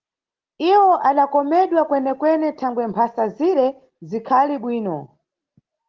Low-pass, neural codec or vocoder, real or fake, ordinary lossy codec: 7.2 kHz; none; real; Opus, 16 kbps